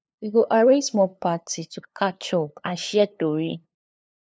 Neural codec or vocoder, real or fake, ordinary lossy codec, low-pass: codec, 16 kHz, 2 kbps, FunCodec, trained on LibriTTS, 25 frames a second; fake; none; none